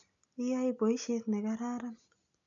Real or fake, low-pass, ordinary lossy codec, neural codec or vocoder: real; 7.2 kHz; AAC, 64 kbps; none